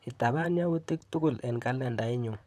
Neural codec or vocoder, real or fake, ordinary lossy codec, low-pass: vocoder, 44.1 kHz, 128 mel bands, Pupu-Vocoder; fake; none; 14.4 kHz